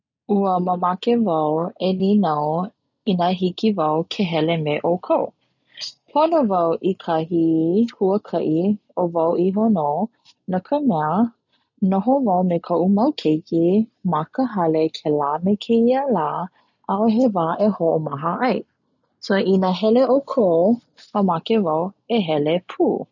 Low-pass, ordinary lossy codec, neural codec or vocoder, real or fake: 7.2 kHz; none; none; real